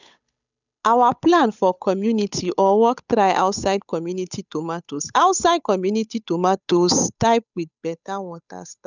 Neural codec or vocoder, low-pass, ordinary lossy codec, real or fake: codec, 16 kHz, 8 kbps, FunCodec, trained on Chinese and English, 25 frames a second; 7.2 kHz; none; fake